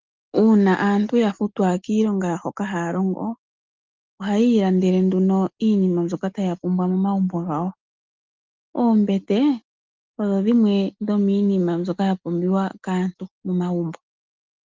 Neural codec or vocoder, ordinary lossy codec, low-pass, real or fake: none; Opus, 32 kbps; 7.2 kHz; real